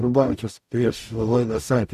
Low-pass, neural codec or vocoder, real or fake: 14.4 kHz; codec, 44.1 kHz, 0.9 kbps, DAC; fake